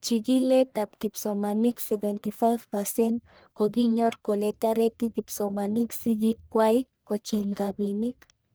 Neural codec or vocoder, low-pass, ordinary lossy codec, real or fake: codec, 44.1 kHz, 1.7 kbps, Pupu-Codec; none; none; fake